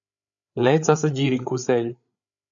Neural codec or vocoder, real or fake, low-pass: codec, 16 kHz, 8 kbps, FreqCodec, larger model; fake; 7.2 kHz